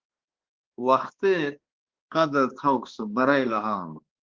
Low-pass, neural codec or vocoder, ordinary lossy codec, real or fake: 7.2 kHz; codec, 16 kHz, 2 kbps, X-Codec, HuBERT features, trained on balanced general audio; Opus, 16 kbps; fake